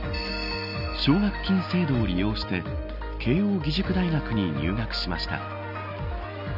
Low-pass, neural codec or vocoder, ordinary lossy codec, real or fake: 5.4 kHz; none; none; real